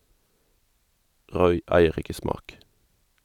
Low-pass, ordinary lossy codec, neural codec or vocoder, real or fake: 19.8 kHz; none; vocoder, 44.1 kHz, 128 mel bands every 256 samples, BigVGAN v2; fake